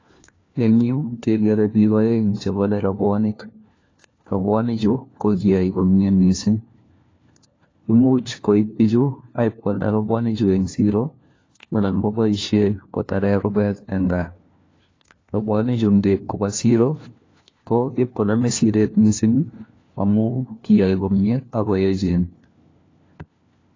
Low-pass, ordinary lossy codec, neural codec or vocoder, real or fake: 7.2 kHz; AAC, 32 kbps; codec, 16 kHz, 1 kbps, FunCodec, trained on LibriTTS, 50 frames a second; fake